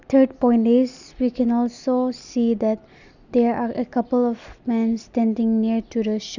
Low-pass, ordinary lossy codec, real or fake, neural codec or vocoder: 7.2 kHz; none; real; none